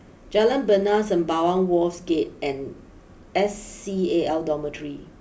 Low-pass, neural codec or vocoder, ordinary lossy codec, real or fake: none; none; none; real